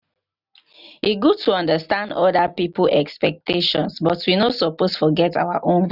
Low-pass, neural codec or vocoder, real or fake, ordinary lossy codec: 5.4 kHz; none; real; none